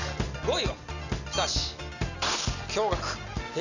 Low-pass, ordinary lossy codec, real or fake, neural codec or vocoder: 7.2 kHz; none; real; none